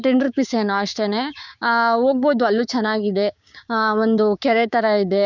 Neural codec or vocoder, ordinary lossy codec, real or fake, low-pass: codec, 16 kHz, 6 kbps, DAC; none; fake; 7.2 kHz